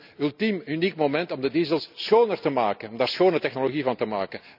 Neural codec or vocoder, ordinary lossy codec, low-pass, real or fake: none; none; 5.4 kHz; real